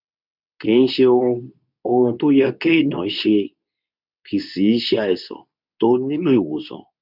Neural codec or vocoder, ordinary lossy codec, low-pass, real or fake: codec, 24 kHz, 0.9 kbps, WavTokenizer, medium speech release version 2; none; 5.4 kHz; fake